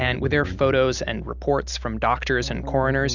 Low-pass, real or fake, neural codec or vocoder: 7.2 kHz; real; none